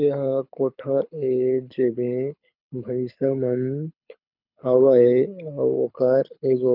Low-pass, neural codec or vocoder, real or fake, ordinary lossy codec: 5.4 kHz; codec, 24 kHz, 6 kbps, HILCodec; fake; MP3, 48 kbps